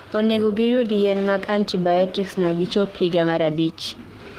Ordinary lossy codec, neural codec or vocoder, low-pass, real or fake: Opus, 24 kbps; codec, 32 kHz, 1.9 kbps, SNAC; 14.4 kHz; fake